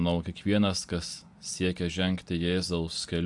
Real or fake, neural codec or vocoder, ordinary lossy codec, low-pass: real; none; AAC, 64 kbps; 10.8 kHz